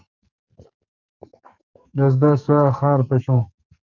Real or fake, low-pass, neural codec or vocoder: fake; 7.2 kHz; codec, 44.1 kHz, 2.6 kbps, SNAC